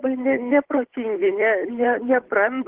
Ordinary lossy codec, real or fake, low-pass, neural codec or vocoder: Opus, 16 kbps; fake; 3.6 kHz; codec, 16 kHz, 8 kbps, FunCodec, trained on Chinese and English, 25 frames a second